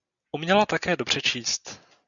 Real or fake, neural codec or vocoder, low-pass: real; none; 7.2 kHz